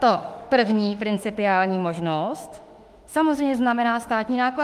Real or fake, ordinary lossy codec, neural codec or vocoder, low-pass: fake; Opus, 32 kbps; autoencoder, 48 kHz, 32 numbers a frame, DAC-VAE, trained on Japanese speech; 14.4 kHz